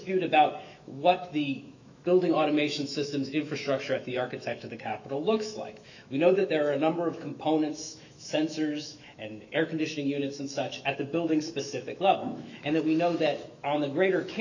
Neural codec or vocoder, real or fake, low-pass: autoencoder, 48 kHz, 128 numbers a frame, DAC-VAE, trained on Japanese speech; fake; 7.2 kHz